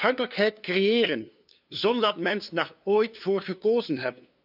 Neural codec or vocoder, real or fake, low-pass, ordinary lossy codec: codec, 16 kHz, 2 kbps, FunCodec, trained on LibriTTS, 25 frames a second; fake; 5.4 kHz; none